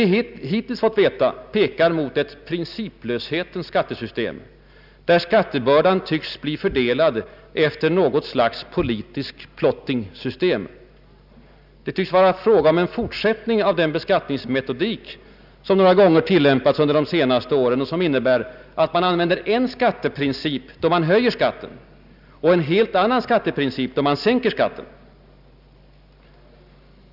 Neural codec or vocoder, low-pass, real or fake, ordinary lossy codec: none; 5.4 kHz; real; none